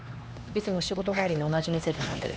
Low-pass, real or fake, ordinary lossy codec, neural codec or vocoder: none; fake; none; codec, 16 kHz, 2 kbps, X-Codec, HuBERT features, trained on LibriSpeech